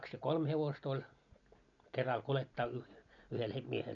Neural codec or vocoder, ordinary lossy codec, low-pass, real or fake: none; none; 7.2 kHz; real